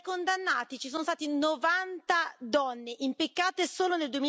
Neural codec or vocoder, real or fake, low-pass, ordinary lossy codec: none; real; none; none